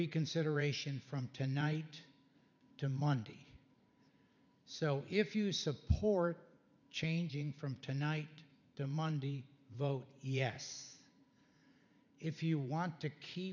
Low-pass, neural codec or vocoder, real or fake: 7.2 kHz; vocoder, 44.1 kHz, 80 mel bands, Vocos; fake